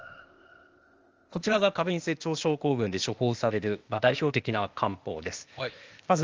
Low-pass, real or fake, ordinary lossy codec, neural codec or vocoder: 7.2 kHz; fake; Opus, 24 kbps; codec, 16 kHz, 0.8 kbps, ZipCodec